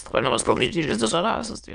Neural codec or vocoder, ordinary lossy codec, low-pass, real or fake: autoencoder, 22.05 kHz, a latent of 192 numbers a frame, VITS, trained on many speakers; AAC, 96 kbps; 9.9 kHz; fake